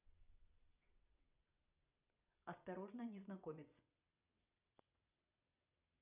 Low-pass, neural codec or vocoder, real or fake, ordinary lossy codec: 3.6 kHz; vocoder, 22.05 kHz, 80 mel bands, WaveNeXt; fake; none